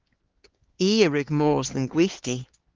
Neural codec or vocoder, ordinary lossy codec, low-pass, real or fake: codec, 16 kHz, 4 kbps, X-Codec, HuBERT features, trained on LibriSpeech; Opus, 16 kbps; 7.2 kHz; fake